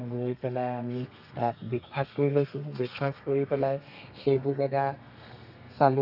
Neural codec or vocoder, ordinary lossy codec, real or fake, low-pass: codec, 32 kHz, 1.9 kbps, SNAC; AAC, 48 kbps; fake; 5.4 kHz